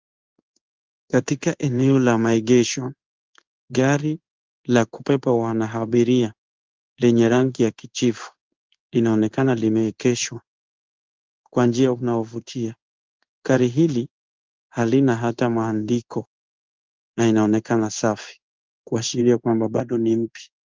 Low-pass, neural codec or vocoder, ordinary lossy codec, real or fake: 7.2 kHz; codec, 16 kHz in and 24 kHz out, 1 kbps, XY-Tokenizer; Opus, 32 kbps; fake